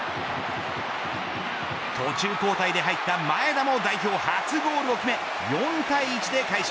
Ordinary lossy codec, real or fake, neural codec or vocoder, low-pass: none; real; none; none